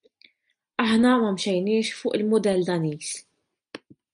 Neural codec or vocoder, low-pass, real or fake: none; 9.9 kHz; real